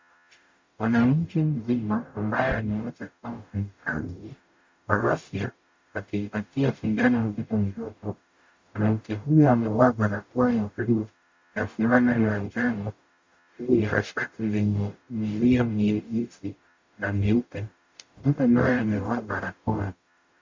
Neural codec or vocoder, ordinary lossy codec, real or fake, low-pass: codec, 44.1 kHz, 0.9 kbps, DAC; MP3, 64 kbps; fake; 7.2 kHz